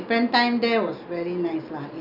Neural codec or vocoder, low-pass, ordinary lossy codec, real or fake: none; 5.4 kHz; none; real